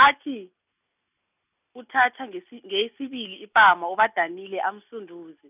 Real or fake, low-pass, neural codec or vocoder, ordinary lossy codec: real; 3.6 kHz; none; none